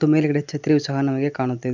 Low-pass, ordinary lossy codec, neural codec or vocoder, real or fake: 7.2 kHz; none; none; real